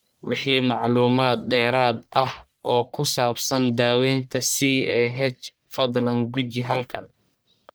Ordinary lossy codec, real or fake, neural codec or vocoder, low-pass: none; fake; codec, 44.1 kHz, 1.7 kbps, Pupu-Codec; none